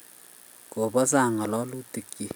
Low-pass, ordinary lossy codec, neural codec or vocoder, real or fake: none; none; none; real